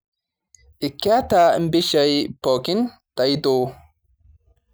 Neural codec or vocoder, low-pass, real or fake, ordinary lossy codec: none; none; real; none